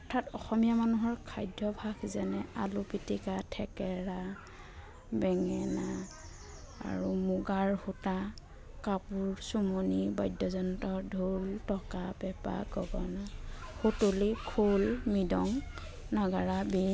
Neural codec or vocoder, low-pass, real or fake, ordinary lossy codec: none; none; real; none